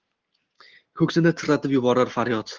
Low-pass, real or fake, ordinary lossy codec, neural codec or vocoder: 7.2 kHz; real; Opus, 16 kbps; none